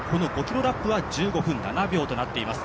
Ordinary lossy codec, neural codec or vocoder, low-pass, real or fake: none; none; none; real